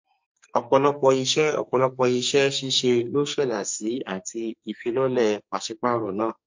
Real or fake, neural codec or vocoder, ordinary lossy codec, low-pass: fake; codec, 44.1 kHz, 2.6 kbps, SNAC; MP3, 48 kbps; 7.2 kHz